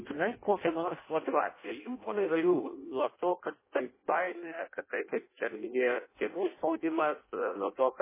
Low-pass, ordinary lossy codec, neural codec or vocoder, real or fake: 3.6 kHz; MP3, 16 kbps; codec, 16 kHz in and 24 kHz out, 0.6 kbps, FireRedTTS-2 codec; fake